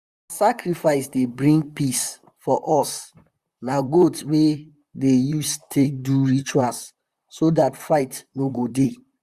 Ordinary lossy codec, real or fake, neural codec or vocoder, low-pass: Opus, 64 kbps; fake; vocoder, 44.1 kHz, 128 mel bands, Pupu-Vocoder; 14.4 kHz